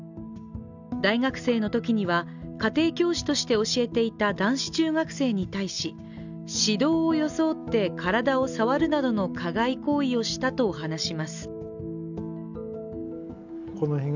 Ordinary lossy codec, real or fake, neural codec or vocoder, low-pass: none; real; none; 7.2 kHz